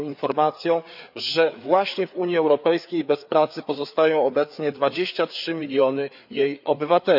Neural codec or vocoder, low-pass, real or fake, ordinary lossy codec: codec, 16 kHz, 4 kbps, FreqCodec, larger model; 5.4 kHz; fake; none